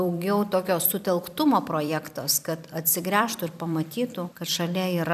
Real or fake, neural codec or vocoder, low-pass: fake; vocoder, 44.1 kHz, 128 mel bands every 256 samples, BigVGAN v2; 14.4 kHz